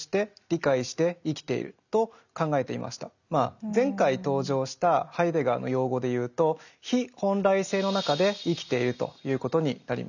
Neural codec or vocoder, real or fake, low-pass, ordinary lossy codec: none; real; 7.2 kHz; none